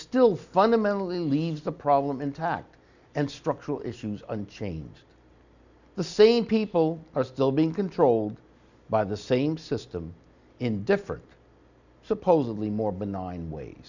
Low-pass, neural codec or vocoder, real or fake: 7.2 kHz; none; real